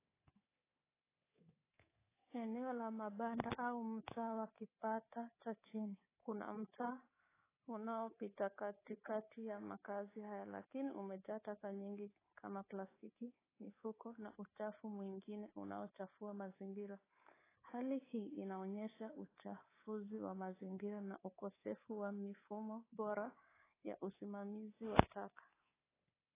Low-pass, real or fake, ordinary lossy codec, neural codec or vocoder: 3.6 kHz; fake; AAC, 16 kbps; codec, 24 kHz, 3.1 kbps, DualCodec